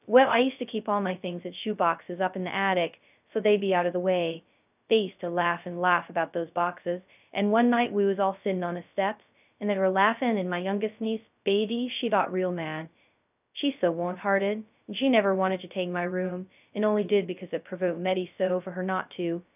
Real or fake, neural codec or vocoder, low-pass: fake; codec, 16 kHz, 0.2 kbps, FocalCodec; 3.6 kHz